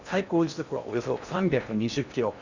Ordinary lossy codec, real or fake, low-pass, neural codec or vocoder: Opus, 64 kbps; fake; 7.2 kHz; codec, 16 kHz in and 24 kHz out, 0.8 kbps, FocalCodec, streaming, 65536 codes